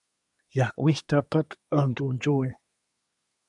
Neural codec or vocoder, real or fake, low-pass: codec, 24 kHz, 1 kbps, SNAC; fake; 10.8 kHz